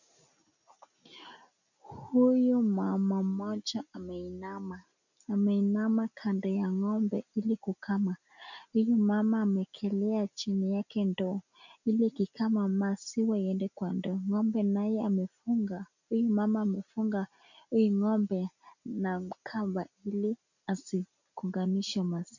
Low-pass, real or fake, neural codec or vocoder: 7.2 kHz; real; none